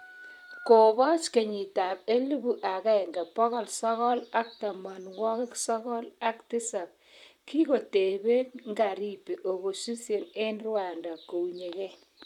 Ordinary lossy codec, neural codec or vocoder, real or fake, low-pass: none; vocoder, 44.1 kHz, 128 mel bands, Pupu-Vocoder; fake; 19.8 kHz